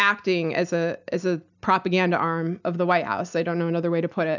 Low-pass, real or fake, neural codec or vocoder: 7.2 kHz; real; none